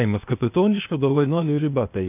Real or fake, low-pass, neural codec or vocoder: fake; 3.6 kHz; codec, 16 kHz, 0.8 kbps, ZipCodec